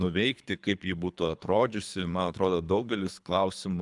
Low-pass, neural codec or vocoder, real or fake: 10.8 kHz; codec, 24 kHz, 3 kbps, HILCodec; fake